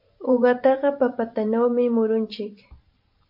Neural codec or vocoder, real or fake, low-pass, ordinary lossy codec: vocoder, 44.1 kHz, 128 mel bands every 512 samples, BigVGAN v2; fake; 5.4 kHz; MP3, 48 kbps